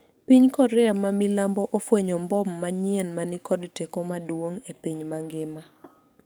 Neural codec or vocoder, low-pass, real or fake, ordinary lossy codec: codec, 44.1 kHz, 7.8 kbps, DAC; none; fake; none